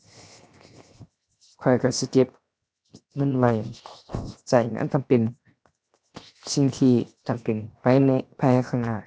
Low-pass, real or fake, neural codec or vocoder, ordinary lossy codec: none; fake; codec, 16 kHz, 0.7 kbps, FocalCodec; none